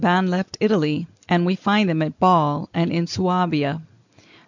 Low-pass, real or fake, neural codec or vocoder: 7.2 kHz; real; none